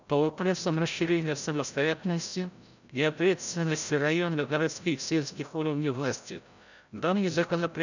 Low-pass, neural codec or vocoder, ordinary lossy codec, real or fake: 7.2 kHz; codec, 16 kHz, 0.5 kbps, FreqCodec, larger model; none; fake